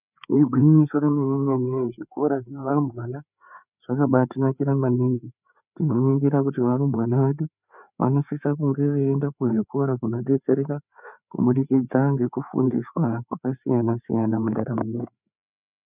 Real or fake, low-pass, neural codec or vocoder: fake; 3.6 kHz; codec, 16 kHz, 4 kbps, FreqCodec, larger model